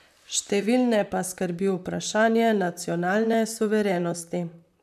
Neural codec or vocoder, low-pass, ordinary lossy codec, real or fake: vocoder, 44.1 kHz, 128 mel bands, Pupu-Vocoder; 14.4 kHz; none; fake